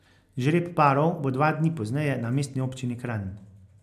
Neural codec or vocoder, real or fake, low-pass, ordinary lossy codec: none; real; 14.4 kHz; MP3, 96 kbps